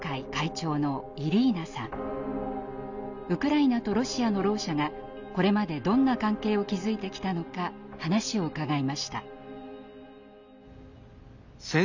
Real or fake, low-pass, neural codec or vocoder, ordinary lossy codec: real; 7.2 kHz; none; none